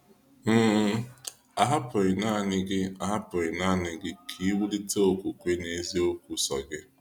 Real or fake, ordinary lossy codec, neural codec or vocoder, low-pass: real; none; none; 19.8 kHz